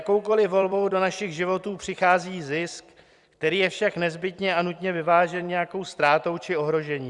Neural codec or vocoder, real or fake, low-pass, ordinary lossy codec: vocoder, 44.1 kHz, 128 mel bands every 256 samples, BigVGAN v2; fake; 10.8 kHz; Opus, 64 kbps